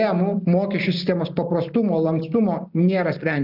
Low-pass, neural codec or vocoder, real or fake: 5.4 kHz; none; real